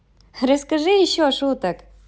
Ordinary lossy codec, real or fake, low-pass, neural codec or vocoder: none; real; none; none